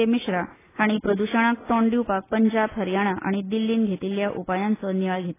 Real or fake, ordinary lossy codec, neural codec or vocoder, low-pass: real; AAC, 16 kbps; none; 3.6 kHz